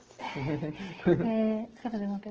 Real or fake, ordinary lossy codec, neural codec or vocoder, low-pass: fake; Opus, 16 kbps; codec, 44.1 kHz, 7.8 kbps, DAC; 7.2 kHz